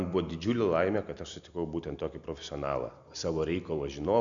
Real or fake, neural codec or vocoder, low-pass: real; none; 7.2 kHz